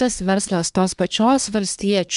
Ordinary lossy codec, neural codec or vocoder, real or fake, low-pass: MP3, 96 kbps; codec, 24 kHz, 1 kbps, SNAC; fake; 10.8 kHz